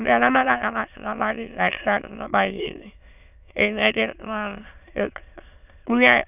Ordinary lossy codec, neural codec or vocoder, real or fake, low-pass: none; autoencoder, 22.05 kHz, a latent of 192 numbers a frame, VITS, trained on many speakers; fake; 3.6 kHz